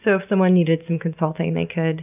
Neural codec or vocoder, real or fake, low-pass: none; real; 3.6 kHz